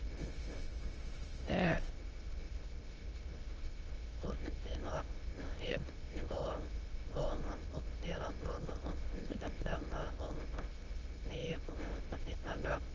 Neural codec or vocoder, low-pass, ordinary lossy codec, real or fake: autoencoder, 22.05 kHz, a latent of 192 numbers a frame, VITS, trained on many speakers; 7.2 kHz; Opus, 24 kbps; fake